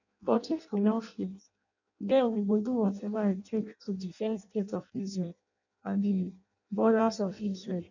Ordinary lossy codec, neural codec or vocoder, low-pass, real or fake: none; codec, 16 kHz in and 24 kHz out, 0.6 kbps, FireRedTTS-2 codec; 7.2 kHz; fake